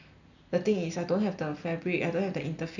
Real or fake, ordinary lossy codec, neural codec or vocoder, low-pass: real; none; none; 7.2 kHz